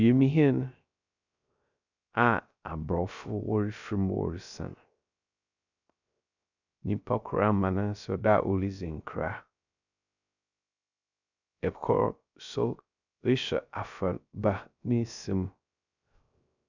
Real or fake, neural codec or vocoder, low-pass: fake; codec, 16 kHz, 0.3 kbps, FocalCodec; 7.2 kHz